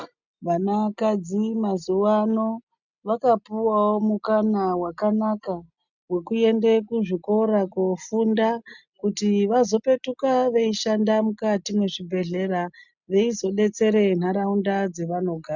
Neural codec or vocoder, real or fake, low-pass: none; real; 7.2 kHz